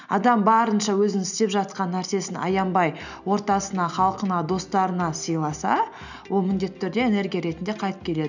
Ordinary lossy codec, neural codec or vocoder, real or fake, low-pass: none; none; real; 7.2 kHz